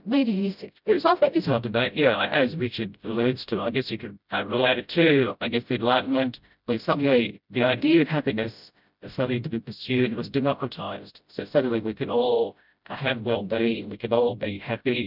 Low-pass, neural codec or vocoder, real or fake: 5.4 kHz; codec, 16 kHz, 0.5 kbps, FreqCodec, smaller model; fake